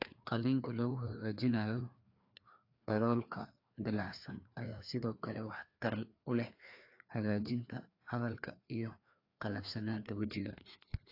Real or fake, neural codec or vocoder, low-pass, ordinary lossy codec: fake; codec, 16 kHz, 2 kbps, FreqCodec, larger model; 5.4 kHz; AAC, 32 kbps